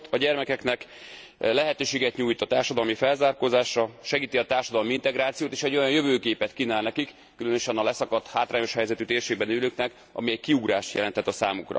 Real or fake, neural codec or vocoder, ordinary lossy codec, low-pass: real; none; none; none